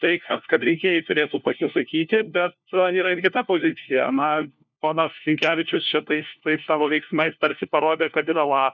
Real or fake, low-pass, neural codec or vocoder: fake; 7.2 kHz; codec, 16 kHz, 1 kbps, FunCodec, trained on LibriTTS, 50 frames a second